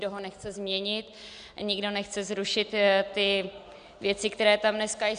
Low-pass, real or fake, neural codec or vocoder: 9.9 kHz; real; none